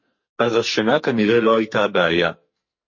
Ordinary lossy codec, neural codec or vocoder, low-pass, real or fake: MP3, 32 kbps; codec, 44.1 kHz, 2.6 kbps, SNAC; 7.2 kHz; fake